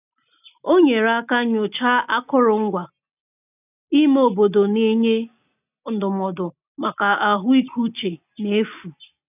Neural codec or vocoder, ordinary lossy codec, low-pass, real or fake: none; none; 3.6 kHz; real